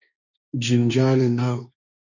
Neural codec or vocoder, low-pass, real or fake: codec, 16 kHz, 1.1 kbps, Voila-Tokenizer; 7.2 kHz; fake